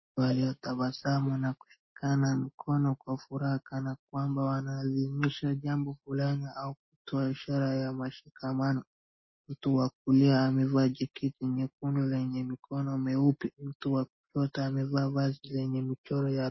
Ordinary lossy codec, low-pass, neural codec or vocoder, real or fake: MP3, 24 kbps; 7.2 kHz; none; real